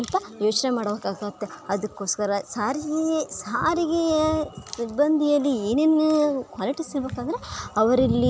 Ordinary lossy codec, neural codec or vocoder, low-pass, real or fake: none; none; none; real